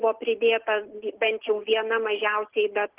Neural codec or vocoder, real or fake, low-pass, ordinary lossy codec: none; real; 3.6 kHz; Opus, 16 kbps